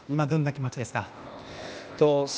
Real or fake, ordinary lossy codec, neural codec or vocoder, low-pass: fake; none; codec, 16 kHz, 0.8 kbps, ZipCodec; none